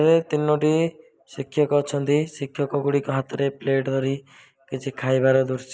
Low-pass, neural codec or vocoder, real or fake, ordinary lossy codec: none; none; real; none